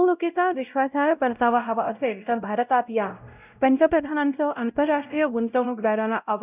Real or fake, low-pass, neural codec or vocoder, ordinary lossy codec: fake; 3.6 kHz; codec, 16 kHz, 0.5 kbps, X-Codec, WavLM features, trained on Multilingual LibriSpeech; none